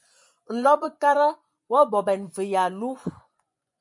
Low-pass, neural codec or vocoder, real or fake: 10.8 kHz; vocoder, 24 kHz, 100 mel bands, Vocos; fake